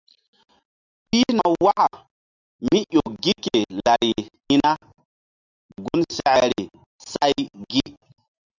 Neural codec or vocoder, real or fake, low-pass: none; real; 7.2 kHz